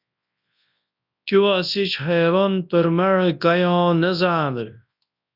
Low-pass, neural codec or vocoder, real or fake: 5.4 kHz; codec, 24 kHz, 0.9 kbps, WavTokenizer, large speech release; fake